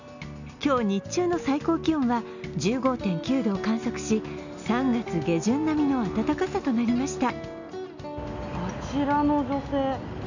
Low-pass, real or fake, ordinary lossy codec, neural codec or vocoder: 7.2 kHz; real; none; none